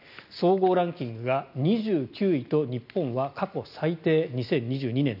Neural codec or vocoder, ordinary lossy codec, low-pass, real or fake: none; none; 5.4 kHz; real